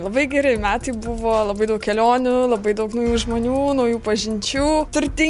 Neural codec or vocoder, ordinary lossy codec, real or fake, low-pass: none; MP3, 64 kbps; real; 10.8 kHz